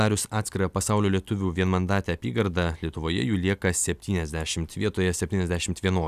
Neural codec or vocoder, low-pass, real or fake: none; 14.4 kHz; real